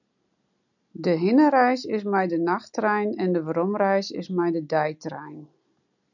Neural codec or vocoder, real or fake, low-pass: none; real; 7.2 kHz